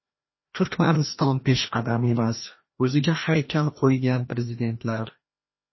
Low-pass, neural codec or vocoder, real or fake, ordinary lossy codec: 7.2 kHz; codec, 16 kHz, 1 kbps, FreqCodec, larger model; fake; MP3, 24 kbps